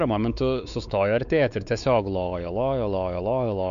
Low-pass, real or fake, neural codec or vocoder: 7.2 kHz; fake; codec, 16 kHz, 4 kbps, X-Codec, WavLM features, trained on Multilingual LibriSpeech